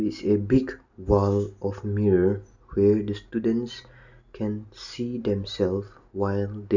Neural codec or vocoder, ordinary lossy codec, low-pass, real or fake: none; none; 7.2 kHz; real